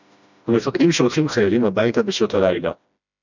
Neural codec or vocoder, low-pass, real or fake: codec, 16 kHz, 1 kbps, FreqCodec, smaller model; 7.2 kHz; fake